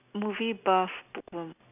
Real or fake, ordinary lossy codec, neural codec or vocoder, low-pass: real; none; none; 3.6 kHz